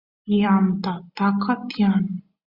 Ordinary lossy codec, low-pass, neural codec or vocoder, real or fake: Opus, 64 kbps; 5.4 kHz; none; real